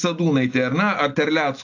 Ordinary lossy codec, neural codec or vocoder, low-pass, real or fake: AAC, 48 kbps; none; 7.2 kHz; real